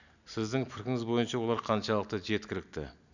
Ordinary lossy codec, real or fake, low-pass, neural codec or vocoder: none; real; 7.2 kHz; none